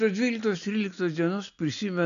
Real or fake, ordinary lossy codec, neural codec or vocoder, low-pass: real; MP3, 96 kbps; none; 7.2 kHz